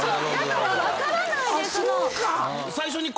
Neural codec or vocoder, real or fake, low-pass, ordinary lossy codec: none; real; none; none